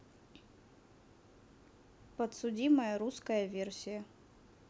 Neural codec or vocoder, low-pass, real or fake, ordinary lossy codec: none; none; real; none